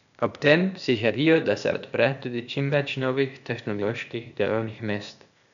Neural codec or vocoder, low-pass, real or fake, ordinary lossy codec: codec, 16 kHz, 0.8 kbps, ZipCodec; 7.2 kHz; fake; none